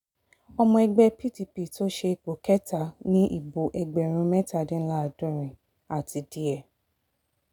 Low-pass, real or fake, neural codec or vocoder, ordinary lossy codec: 19.8 kHz; real; none; none